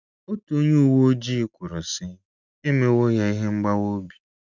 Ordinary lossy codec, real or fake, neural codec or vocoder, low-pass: none; real; none; 7.2 kHz